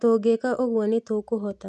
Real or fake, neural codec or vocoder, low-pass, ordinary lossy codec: real; none; none; none